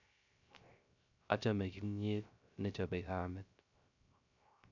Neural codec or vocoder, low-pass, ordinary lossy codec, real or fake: codec, 16 kHz, 0.3 kbps, FocalCodec; 7.2 kHz; none; fake